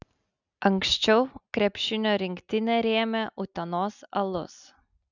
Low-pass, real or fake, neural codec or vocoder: 7.2 kHz; real; none